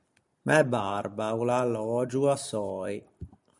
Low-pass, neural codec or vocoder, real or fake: 10.8 kHz; none; real